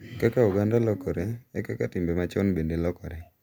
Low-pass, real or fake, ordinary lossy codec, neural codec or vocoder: none; real; none; none